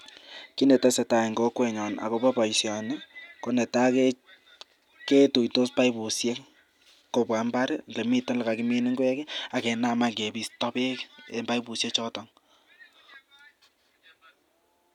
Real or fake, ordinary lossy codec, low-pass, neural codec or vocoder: real; none; 19.8 kHz; none